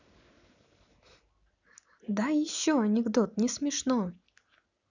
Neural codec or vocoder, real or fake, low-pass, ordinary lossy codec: none; real; 7.2 kHz; none